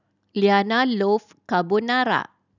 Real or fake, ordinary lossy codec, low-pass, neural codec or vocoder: real; none; 7.2 kHz; none